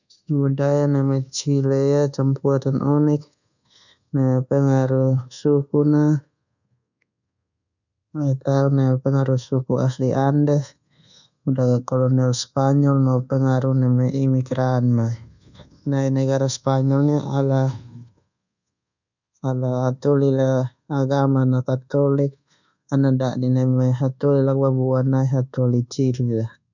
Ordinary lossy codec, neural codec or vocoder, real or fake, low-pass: none; codec, 24 kHz, 1.2 kbps, DualCodec; fake; 7.2 kHz